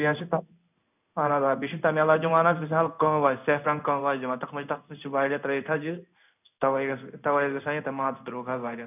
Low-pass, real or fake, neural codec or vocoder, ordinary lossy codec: 3.6 kHz; fake; codec, 16 kHz in and 24 kHz out, 1 kbps, XY-Tokenizer; none